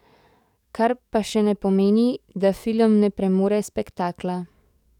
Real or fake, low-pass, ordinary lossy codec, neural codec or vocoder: fake; 19.8 kHz; none; codec, 44.1 kHz, 7.8 kbps, DAC